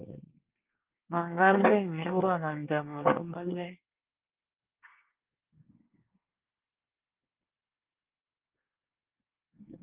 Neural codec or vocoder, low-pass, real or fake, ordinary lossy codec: codec, 24 kHz, 1 kbps, SNAC; 3.6 kHz; fake; Opus, 32 kbps